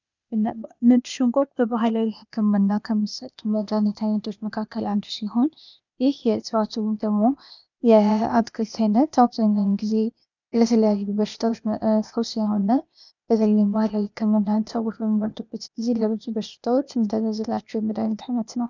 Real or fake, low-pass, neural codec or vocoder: fake; 7.2 kHz; codec, 16 kHz, 0.8 kbps, ZipCodec